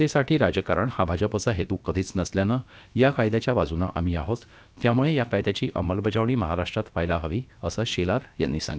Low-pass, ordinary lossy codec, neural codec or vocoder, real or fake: none; none; codec, 16 kHz, about 1 kbps, DyCAST, with the encoder's durations; fake